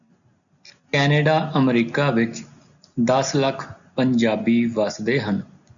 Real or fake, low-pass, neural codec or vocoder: real; 7.2 kHz; none